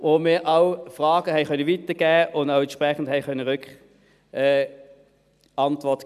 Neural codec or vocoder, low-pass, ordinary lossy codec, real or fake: none; 14.4 kHz; none; real